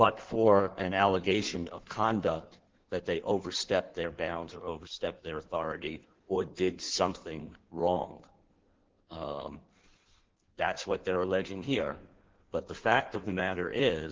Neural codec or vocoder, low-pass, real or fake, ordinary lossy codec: codec, 16 kHz in and 24 kHz out, 1.1 kbps, FireRedTTS-2 codec; 7.2 kHz; fake; Opus, 16 kbps